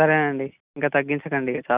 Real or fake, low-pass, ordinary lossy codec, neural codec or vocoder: real; 3.6 kHz; Opus, 64 kbps; none